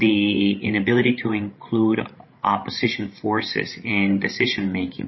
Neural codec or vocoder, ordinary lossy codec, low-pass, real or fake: codec, 16 kHz, 16 kbps, FreqCodec, larger model; MP3, 24 kbps; 7.2 kHz; fake